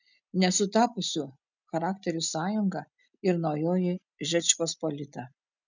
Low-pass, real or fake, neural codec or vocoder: 7.2 kHz; real; none